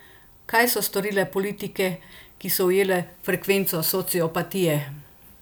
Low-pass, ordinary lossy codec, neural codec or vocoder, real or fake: none; none; none; real